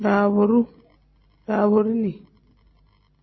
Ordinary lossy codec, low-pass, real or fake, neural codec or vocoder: MP3, 24 kbps; 7.2 kHz; real; none